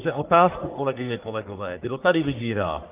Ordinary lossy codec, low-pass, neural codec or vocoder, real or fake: Opus, 32 kbps; 3.6 kHz; codec, 44.1 kHz, 1.7 kbps, Pupu-Codec; fake